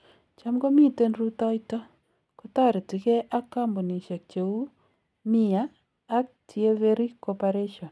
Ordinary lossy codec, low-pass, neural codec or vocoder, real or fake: none; none; none; real